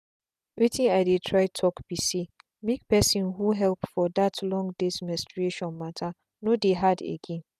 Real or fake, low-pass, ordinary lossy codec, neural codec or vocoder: real; 14.4 kHz; none; none